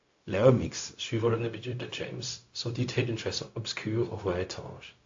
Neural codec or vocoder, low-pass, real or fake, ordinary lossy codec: codec, 16 kHz, 0.4 kbps, LongCat-Audio-Codec; 7.2 kHz; fake; AAC, 48 kbps